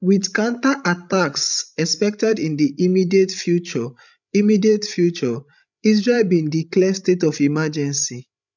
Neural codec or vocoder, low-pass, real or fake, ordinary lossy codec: codec, 16 kHz, 16 kbps, FreqCodec, larger model; 7.2 kHz; fake; none